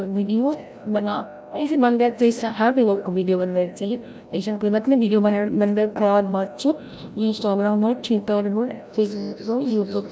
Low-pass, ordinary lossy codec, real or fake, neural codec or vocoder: none; none; fake; codec, 16 kHz, 0.5 kbps, FreqCodec, larger model